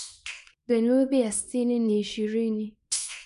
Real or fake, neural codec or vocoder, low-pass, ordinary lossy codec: fake; codec, 24 kHz, 0.9 kbps, WavTokenizer, small release; 10.8 kHz; none